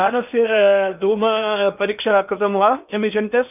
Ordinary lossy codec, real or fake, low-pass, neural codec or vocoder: none; fake; 3.6 kHz; codec, 16 kHz in and 24 kHz out, 0.8 kbps, FocalCodec, streaming, 65536 codes